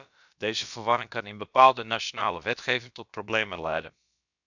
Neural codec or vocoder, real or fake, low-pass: codec, 16 kHz, about 1 kbps, DyCAST, with the encoder's durations; fake; 7.2 kHz